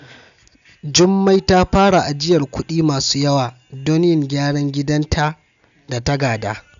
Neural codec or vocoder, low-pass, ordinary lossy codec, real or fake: none; 7.2 kHz; none; real